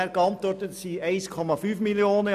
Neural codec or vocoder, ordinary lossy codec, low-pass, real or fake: none; none; 14.4 kHz; real